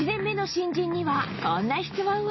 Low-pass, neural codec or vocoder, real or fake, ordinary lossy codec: 7.2 kHz; none; real; MP3, 24 kbps